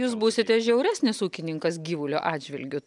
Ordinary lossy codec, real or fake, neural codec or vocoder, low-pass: MP3, 96 kbps; real; none; 10.8 kHz